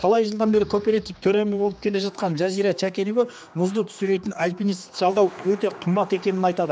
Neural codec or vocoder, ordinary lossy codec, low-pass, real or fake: codec, 16 kHz, 2 kbps, X-Codec, HuBERT features, trained on general audio; none; none; fake